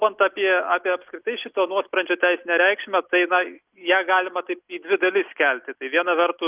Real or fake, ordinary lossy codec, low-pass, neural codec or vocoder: real; Opus, 24 kbps; 3.6 kHz; none